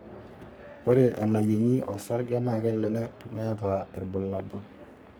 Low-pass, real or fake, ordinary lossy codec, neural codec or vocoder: none; fake; none; codec, 44.1 kHz, 3.4 kbps, Pupu-Codec